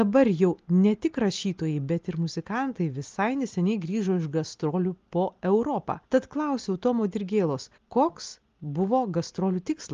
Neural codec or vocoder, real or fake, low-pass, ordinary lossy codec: none; real; 7.2 kHz; Opus, 32 kbps